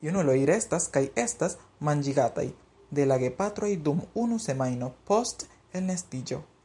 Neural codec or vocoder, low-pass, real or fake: none; 10.8 kHz; real